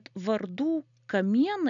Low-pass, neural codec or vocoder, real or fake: 7.2 kHz; none; real